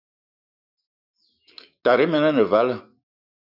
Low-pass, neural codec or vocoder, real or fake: 5.4 kHz; autoencoder, 48 kHz, 128 numbers a frame, DAC-VAE, trained on Japanese speech; fake